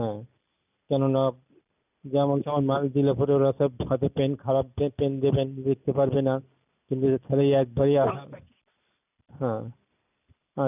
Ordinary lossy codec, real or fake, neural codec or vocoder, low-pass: none; real; none; 3.6 kHz